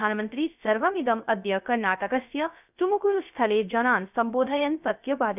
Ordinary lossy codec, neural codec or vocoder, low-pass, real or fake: none; codec, 16 kHz, 0.3 kbps, FocalCodec; 3.6 kHz; fake